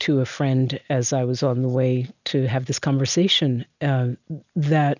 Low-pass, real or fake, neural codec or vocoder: 7.2 kHz; real; none